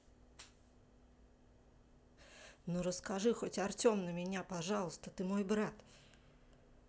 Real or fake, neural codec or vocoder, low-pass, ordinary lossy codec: real; none; none; none